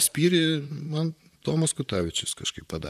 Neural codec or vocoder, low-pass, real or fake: vocoder, 44.1 kHz, 128 mel bands, Pupu-Vocoder; 14.4 kHz; fake